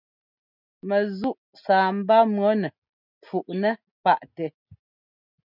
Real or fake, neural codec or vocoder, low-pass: real; none; 5.4 kHz